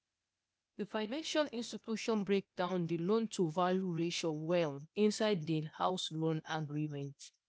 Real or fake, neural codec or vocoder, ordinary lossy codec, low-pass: fake; codec, 16 kHz, 0.8 kbps, ZipCodec; none; none